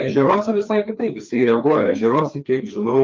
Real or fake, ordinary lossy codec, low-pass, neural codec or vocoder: fake; Opus, 24 kbps; 7.2 kHz; codec, 16 kHz, 2 kbps, FreqCodec, larger model